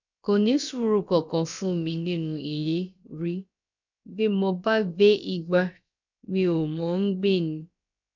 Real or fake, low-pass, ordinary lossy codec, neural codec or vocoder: fake; 7.2 kHz; none; codec, 16 kHz, about 1 kbps, DyCAST, with the encoder's durations